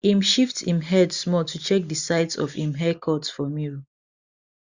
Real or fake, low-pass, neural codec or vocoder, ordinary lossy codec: real; 7.2 kHz; none; Opus, 64 kbps